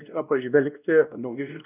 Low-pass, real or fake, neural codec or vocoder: 3.6 kHz; fake; codec, 16 kHz, 1 kbps, X-Codec, WavLM features, trained on Multilingual LibriSpeech